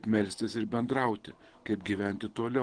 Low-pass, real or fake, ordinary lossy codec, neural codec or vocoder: 9.9 kHz; fake; Opus, 16 kbps; codec, 24 kHz, 6 kbps, HILCodec